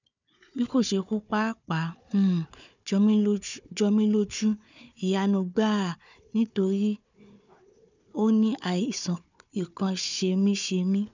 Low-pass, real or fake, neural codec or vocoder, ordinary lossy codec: 7.2 kHz; fake; codec, 16 kHz, 4 kbps, FunCodec, trained on Chinese and English, 50 frames a second; none